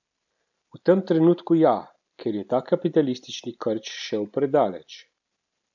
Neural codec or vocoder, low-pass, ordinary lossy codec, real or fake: none; 7.2 kHz; none; real